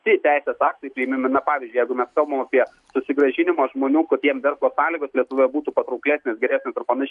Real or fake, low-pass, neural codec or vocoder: real; 10.8 kHz; none